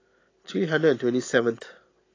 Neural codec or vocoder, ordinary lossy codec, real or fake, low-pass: none; AAC, 32 kbps; real; 7.2 kHz